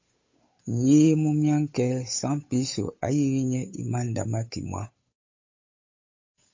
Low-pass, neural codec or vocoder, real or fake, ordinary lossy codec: 7.2 kHz; codec, 16 kHz, 8 kbps, FunCodec, trained on Chinese and English, 25 frames a second; fake; MP3, 32 kbps